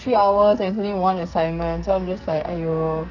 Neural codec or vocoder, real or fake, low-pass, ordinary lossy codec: codec, 44.1 kHz, 2.6 kbps, SNAC; fake; 7.2 kHz; none